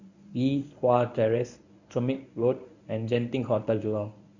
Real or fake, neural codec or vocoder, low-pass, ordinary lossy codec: fake; codec, 24 kHz, 0.9 kbps, WavTokenizer, medium speech release version 1; 7.2 kHz; none